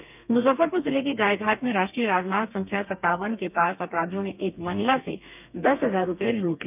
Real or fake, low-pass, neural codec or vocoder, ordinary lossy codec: fake; 3.6 kHz; codec, 32 kHz, 1.9 kbps, SNAC; none